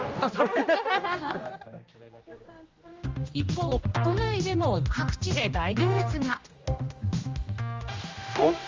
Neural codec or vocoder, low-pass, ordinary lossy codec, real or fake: codec, 16 kHz, 1 kbps, X-Codec, HuBERT features, trained on general audio; 7.2 kHz; Opus, 32 kbps; fake